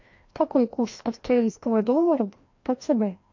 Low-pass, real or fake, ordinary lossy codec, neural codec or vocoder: 7.2 kHz; fake; MP3, 48 kbps; codec, 16 kHz, 1 kbps, FreqCodec, larger model